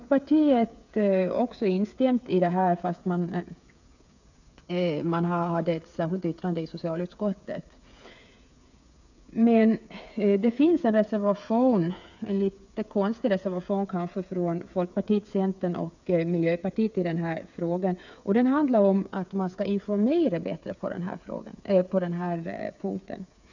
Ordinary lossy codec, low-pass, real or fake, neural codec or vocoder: none; 7.2 kHz; fake; codec, 16 kHz, 8 kbps, FreqCodec, smaller model